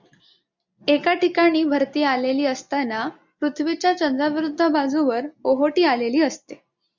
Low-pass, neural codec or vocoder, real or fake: 7.2 kHz; none; real